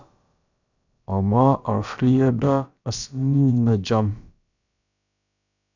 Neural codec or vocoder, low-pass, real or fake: codec, 16 kHz, about 1 kbps, DyCAST, with the encoder's durations; 7.2 kHz; fake